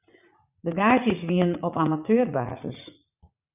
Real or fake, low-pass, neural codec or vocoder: fake; 3.6 kHz; vocoder, 44.1 kHz, 80 mel bands, Vocos